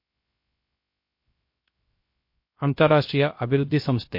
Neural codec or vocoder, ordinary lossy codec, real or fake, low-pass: codec, 16 kHz, 0.3 kbps, FocalCodec; MP3, 32 kbps; fake; 5.4 kHz